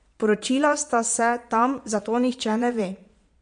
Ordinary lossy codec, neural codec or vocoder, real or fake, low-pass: MP3, 48 kbps; vocoder, 22.05 kHz, 80 mel bands, Vocos; fake; 9.9 kHz